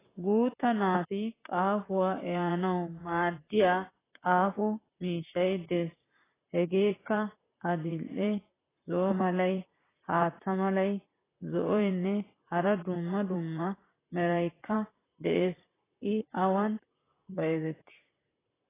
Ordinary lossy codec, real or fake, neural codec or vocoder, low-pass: AAC, 16 kbps; fake; vocoder, 44.1 kHz, 128 mel bands, Pupu-Vocoder; 3.6 kHz